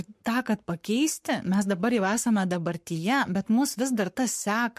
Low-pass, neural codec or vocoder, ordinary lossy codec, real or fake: 14.4 kHz; codec, 44.1 kHz, 7.8 kbps, Pupu-Codec; MP3, 64 kbps; fake